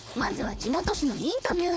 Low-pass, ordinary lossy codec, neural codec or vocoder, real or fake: none; none; codec, 16 kHz, 4.8 kbps, FACodec; fake